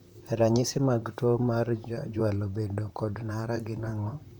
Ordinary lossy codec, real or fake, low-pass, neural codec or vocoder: none; fake; 19.8 kHz; vocoder, 44.1 kHz, 128 mel bands, Pupu-Vocoder